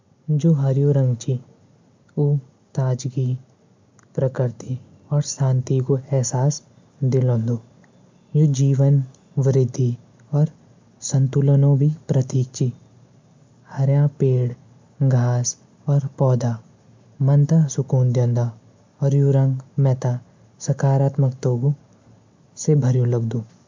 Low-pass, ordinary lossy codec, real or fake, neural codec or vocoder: 7.2 kHz; MP3, 64 kbps; real; none